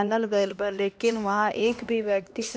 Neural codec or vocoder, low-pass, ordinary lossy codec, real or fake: codec, 16 kHz, 1 kbps, X-Codec, HuBERT features, trained on LibriSpeech; none; none; fake